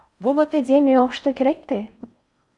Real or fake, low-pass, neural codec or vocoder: fake; 10.8 kHz; codec, 16 kHz in and 24 kHz out, 0.6 kbps, FocalCodec, streaming, 4096 codes